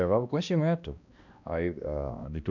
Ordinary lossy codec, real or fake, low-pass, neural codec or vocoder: none; fake; 7.2 kHz; codec, 16 kHz, 1 kbps, X-Codec, HuBERT features, trained on balanced general audio